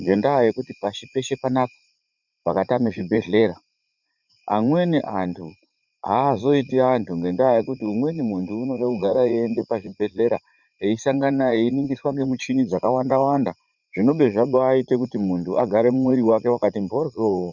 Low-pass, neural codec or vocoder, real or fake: 7.2 kHz; vocoder, 44.1 kHz, 80 mel bands, Vocos; fake